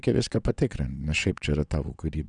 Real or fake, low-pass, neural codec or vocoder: fake; 9.9 kHz; vocoder, 22.05 kHz, 80 mel bands, WaveNeXt